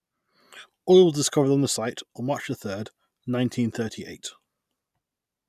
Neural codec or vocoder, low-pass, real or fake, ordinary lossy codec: none; 14.4 kHz; real; none